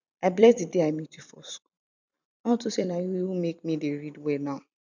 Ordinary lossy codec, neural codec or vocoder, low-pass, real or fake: none; vocoder, 44.1 kHz, 128 mel bands every 256 samples, BigVGAN v2; 7.2 kHz; fake